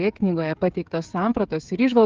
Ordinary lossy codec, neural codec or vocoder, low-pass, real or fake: Opus, 32 kbps; codec, 16 kHz, 8 kbps, FreqCodec, smaller model; 7.2 kHz; fake